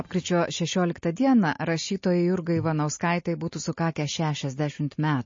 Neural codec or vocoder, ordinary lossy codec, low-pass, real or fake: none; MP3, 32 kbps; 7.2 kHz; real